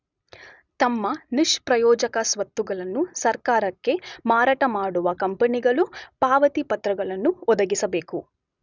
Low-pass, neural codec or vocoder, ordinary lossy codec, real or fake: 7.2 kHz; none; none; real